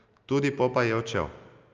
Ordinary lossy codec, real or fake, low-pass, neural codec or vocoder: Opus, 24 kbps; real; 7.2 kHz; none